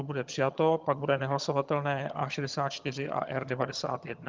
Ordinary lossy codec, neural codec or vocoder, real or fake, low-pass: Opus, 24 kbps; vocoder, 22.05 kHz, 80 mel bands, HiFi-GAN; fake; 7.2 kHz